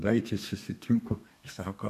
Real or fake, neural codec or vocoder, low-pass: fake; codec, 32 kHz, 1.9 kbps, SNAC; 14.4 kHz